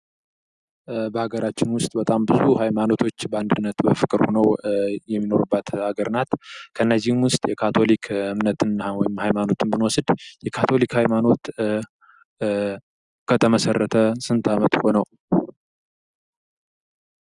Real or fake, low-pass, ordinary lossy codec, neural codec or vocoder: real; 10.8 kHz; Opus, 64 kbps; none